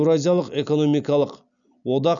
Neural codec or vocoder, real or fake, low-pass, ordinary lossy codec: none; real; 7.2 kHz; none